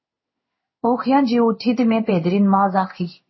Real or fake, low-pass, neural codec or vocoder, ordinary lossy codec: fake; 7.2 kHz; codec, 16 kHz in and 24 kHz out, 1 kbps, XY-Tokenizer; MP3, 24 kbps